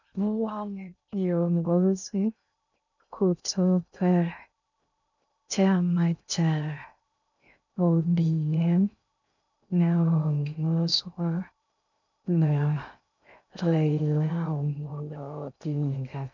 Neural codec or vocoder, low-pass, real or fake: codec, 16 kHz in and 24 kHz out, 0.6 kbps, FocalCodec, streaming, 2048 codes; 7.2 kHz; fake